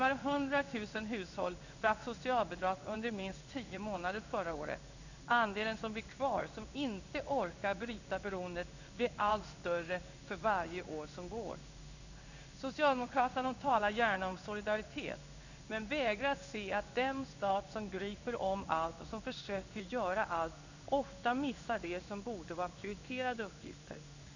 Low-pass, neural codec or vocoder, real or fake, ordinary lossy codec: 7.2 kHz; codec, 16 kHz in and 24 kHz out, 1 kbps, XY-Tokenizer; fake; none